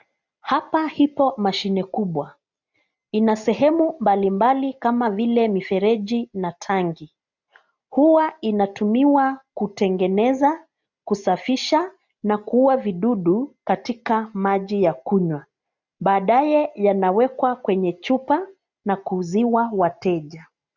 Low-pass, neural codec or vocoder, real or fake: 7.2 kHz; none; real